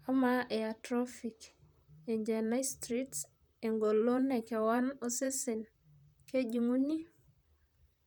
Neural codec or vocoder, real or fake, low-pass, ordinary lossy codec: vocoder, 44.1 kHz, 128 mel bands, Pupu-Vocoder; fake; none; none